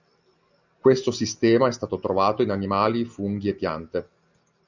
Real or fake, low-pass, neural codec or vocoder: real; 7.2 kHz; none